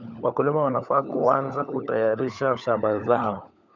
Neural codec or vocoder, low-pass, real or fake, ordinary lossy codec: codec, 16 kHz, 16 kbps, FunCodec, trained on LibriTTS, 50 frames a second; 7.2 kHz; fake; none